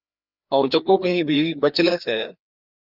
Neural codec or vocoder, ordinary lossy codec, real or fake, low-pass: codec, 16 kHz, 2 kbps, FreqCodec, larger model; Opus, 64 kbps; fake; 5.4 kHz